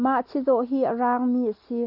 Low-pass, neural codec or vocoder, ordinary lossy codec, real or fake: 5.4 kHz; none; AAC, 48 kbps; real